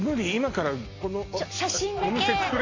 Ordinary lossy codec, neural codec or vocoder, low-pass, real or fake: AAC, 32 kbps; none; 7.2 kHz; real